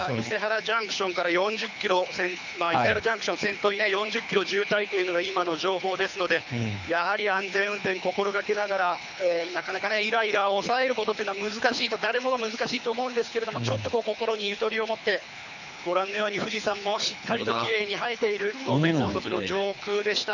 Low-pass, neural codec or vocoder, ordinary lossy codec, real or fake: 7.2 kHz; codec, 24 kHz, 3 kbps, HILCodec; none; fake